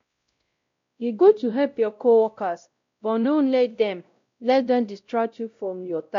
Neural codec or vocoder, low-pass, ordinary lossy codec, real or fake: codec, 16 kHz, 0.5 kbps, X-Codec, WavLM features, trained on Multilingual LibriSpeech; 7.2 kHz; MP3, 64 kbps; fake